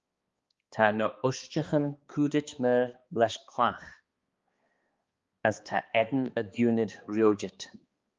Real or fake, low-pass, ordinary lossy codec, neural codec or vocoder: fake; 7.2 kHz; Opus, 32 kbps; codec, 16 kHz, 2 kbps, X-Codec, HuBERT features, trained on balanced general audio